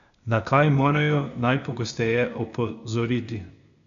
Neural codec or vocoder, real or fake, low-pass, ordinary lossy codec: codec, 16 kHz, 0.8 kbps, ZipCodec; fake; 7.2 kHz; none